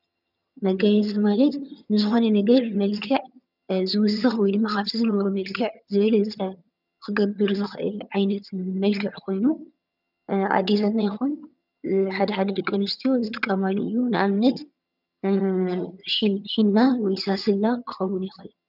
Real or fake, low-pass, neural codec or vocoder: fake; 5.4 kHz; vocoder, 22.05 kHz, 80 mel bands, HiFi-GAN